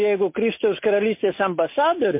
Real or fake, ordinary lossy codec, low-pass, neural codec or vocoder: real; MP3, 24 kbps; 3.6 kHz; none